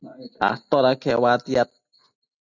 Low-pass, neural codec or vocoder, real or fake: 7.2 kHz; none; real